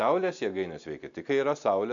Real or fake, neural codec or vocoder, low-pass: real; none; 7.2 kHz